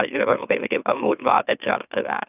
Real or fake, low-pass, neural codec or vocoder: fake; 3.6 kHz; autoencoder, 44.1 kHz, a latent of 192 numbers a frame, MeloTTS